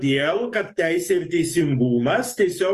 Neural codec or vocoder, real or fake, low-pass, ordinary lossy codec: codec, 44.1 kHz, 7.8 kbps, DAC; fake; 14.4 kHz; Opus, 32 kbps